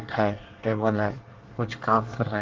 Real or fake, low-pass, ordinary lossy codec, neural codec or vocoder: fake; 7.2 kHz; Opus, 16 kbps; codec, 24 kHz, 1 kbps, SNAC